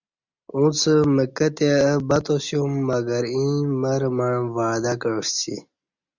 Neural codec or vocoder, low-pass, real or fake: none; 7.2 kHz; real